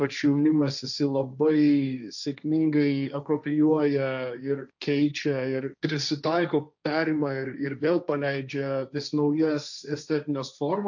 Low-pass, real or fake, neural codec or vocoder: 7.2 kHz; fake; codec, 16 kHz, 1.1 kbps, Voila-Tokenizer